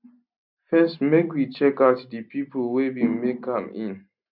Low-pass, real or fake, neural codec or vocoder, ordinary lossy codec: 5.4 kHz; real; none; none